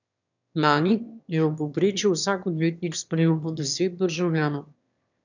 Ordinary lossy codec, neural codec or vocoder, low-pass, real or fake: none; autoencoder, 22.05 kHz, a latent of 192 numbers a frame, VITS, trained on one speaker; 7.2 kHz; fake